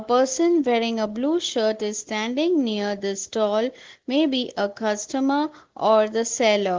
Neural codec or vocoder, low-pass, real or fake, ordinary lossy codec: none; 7.2 kHz; real; Opus, 16 kbps